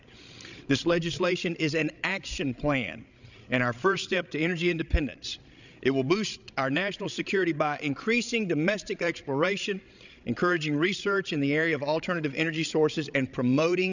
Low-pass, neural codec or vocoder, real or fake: 7.2 kHz; codec, 16 kHz, 16 kbps, FreqCodec, larger model; fake